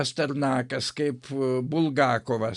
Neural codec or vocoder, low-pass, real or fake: none; 10.8 kHz; real